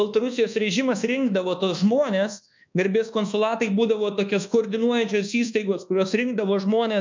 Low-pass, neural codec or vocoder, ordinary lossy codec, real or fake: 7.2 kHz; codec, 24 kHz, 1.2 kbps, DualCodec; MP3, 64 kbps; fake